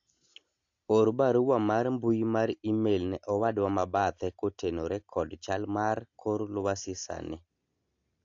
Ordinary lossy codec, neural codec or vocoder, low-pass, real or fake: AAC, 48 kbps; none; 7.2 kHz; real